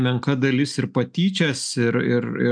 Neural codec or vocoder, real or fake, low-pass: none; real; 9.9 kHz